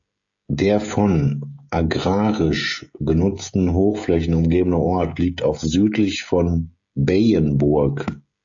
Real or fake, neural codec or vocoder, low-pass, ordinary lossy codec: fake; codec, 16 kHz, 16 kbps, FreqCodec, smaller model; 7.2 kHz; AAC, 64 kbps